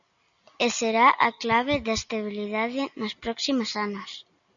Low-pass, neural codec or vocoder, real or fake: 7.2 kHz; none; real